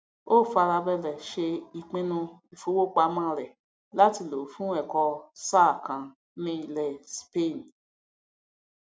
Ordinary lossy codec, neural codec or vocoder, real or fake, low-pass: none; none; real; none